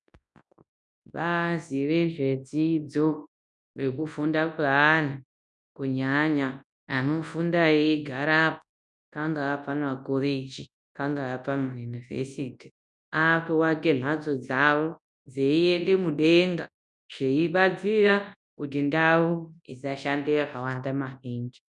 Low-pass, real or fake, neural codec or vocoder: 10.8 kHz; fake; codec, 24 kHz, 0.9 kbps, WavTokenizer, large speech release